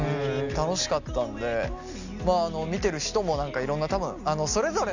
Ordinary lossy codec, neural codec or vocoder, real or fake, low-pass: none; none; real; 7.2 kHz